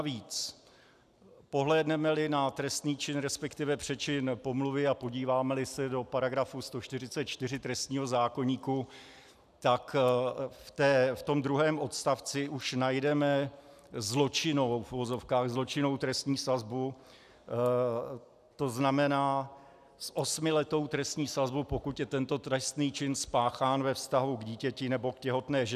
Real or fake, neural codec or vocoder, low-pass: real; none; 14.4 kHz